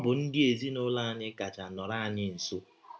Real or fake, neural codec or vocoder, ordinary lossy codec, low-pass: real; none; none; none